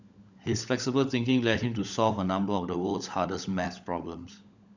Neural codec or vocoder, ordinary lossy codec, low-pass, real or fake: codec, 16 kHz, 16 kbps, FunCodec, trained on LibriTTS, 50 frames a second; none; 7.2 kHz; fake